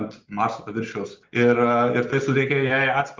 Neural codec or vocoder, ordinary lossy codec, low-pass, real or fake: none; Opus, 32 kbps; 7.2 kHz; real